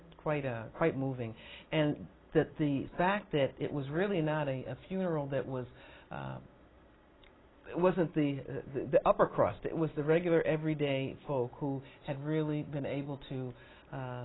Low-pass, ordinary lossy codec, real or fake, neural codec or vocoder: 7.2 kHz; AAC, 16 kbps; real; none